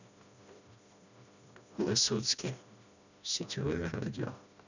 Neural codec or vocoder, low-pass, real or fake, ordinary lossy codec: codec, 16 kHz, 1 kbps, FreqCodec, smaller model; 7.2 kHz; fake; none